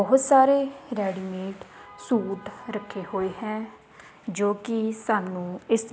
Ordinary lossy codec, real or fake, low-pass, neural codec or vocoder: none; real; none; none